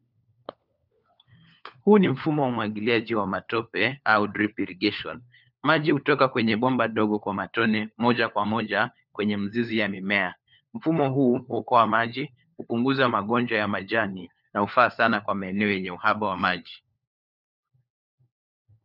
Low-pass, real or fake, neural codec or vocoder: 5.4 kHz; fake; codec, 16 kHz, 4 kbps, FunCodec, trained on LibriTTS, 50 frames a second